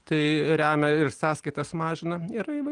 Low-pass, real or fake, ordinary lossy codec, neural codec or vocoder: 9.9 kHz; real; Opus, 24 kbps; none